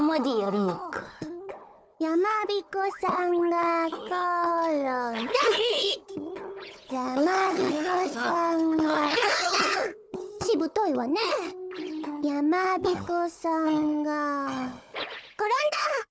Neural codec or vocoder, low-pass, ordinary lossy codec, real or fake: codec, 16 kHz, 4 kbps, FunCodec, trained on Chinese and English, 50 frames a second; none; none; fake